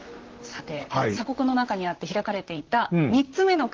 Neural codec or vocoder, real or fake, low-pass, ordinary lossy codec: vocoder, 44.1 kHz, 128 mel bands, Pupu-Vocoder; fake; 7.2 kHz; Opus, 16 kbps